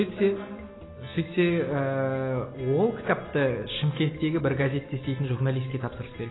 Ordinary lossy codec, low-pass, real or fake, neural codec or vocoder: AAC, 16 kbps; 7.2 kHz; real; none